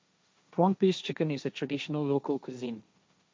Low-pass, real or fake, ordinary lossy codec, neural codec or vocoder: none; fake; none; codec, 16 kHz, 1.1 kbps, Voila-Tokenizer